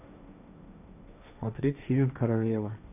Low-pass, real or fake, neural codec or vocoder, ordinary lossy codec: 3.6 kHz; fake; codec, 16 kHz, 1.1 kbps, Voila-Tokenizer; none